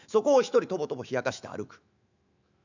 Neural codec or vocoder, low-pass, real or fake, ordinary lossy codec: autoencoder, 48 kHz, 128 numbers a frame, DAC-VAE, trained on Japanese speech; 7.2 kHz; fake; none